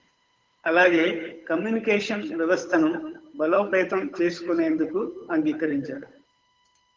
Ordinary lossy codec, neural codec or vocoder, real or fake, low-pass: Opus, 32 kbps; codec, 16 kHz, 8 kbps, FunCodec, trained on Chinese and English, 25 frames a second; fake; 7.2 kHz